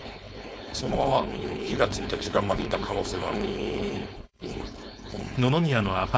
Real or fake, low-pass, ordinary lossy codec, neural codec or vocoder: fake; none; none; codec, 16 kHz, 4.8 kbps, FACodec